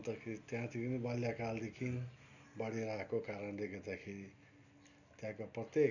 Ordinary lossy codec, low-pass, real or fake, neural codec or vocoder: none; 7.2 kHz; real; none